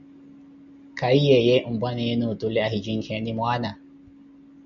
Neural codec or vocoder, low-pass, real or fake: none; 7.2 kHz; real